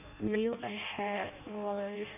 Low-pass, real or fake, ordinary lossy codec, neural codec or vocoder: 3.6 kHz; fake; none; codec, 16 kHz in and 24 kHz out, 0.6 kbps, FireRedTTS-2 codec